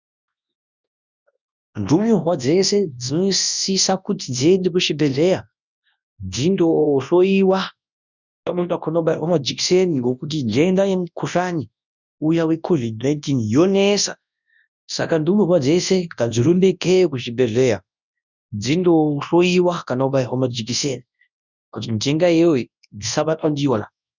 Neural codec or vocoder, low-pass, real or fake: codec, 24 kHz, 0.9 kbps, WavTokenizer, large speech release; 7.2 kHz; fake